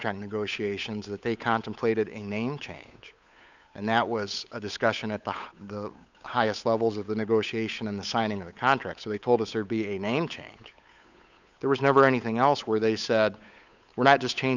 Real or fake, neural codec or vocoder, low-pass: fake; codec, 16 kHz, 8 kbps, FunCodec, trained on Chinese and English, 25 frames a second; 7.2 kHz